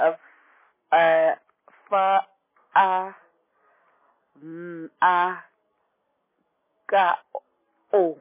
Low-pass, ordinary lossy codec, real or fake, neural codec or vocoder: 3.6 kHz; MP3, 16 kbps; real; none